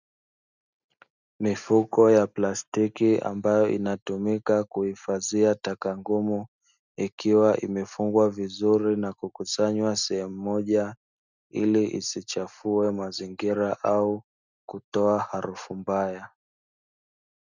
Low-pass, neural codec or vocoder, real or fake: 7.2 kHz; none; real